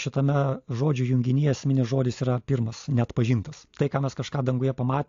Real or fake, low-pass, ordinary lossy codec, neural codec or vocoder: real; 7.2 kHz; AAC, 48 kbps; none